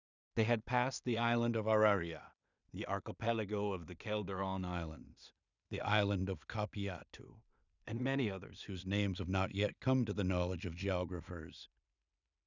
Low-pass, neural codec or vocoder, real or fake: 7.2 kHz; codec, 16 kHz in and 24 kHz out, 0.4 kbps, LongCat-Audio-Codec, two codebook decoder; fake